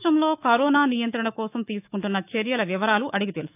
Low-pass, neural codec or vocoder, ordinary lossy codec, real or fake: 3.6 kHz; autoencoder, 48 kHz, 128 numbers a frame, DAC-VAE, trained on Japanese speech; none; fake